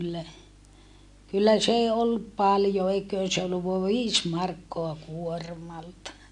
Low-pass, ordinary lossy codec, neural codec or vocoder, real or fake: 10.8 kHz; AAC, 64 kbps; none; real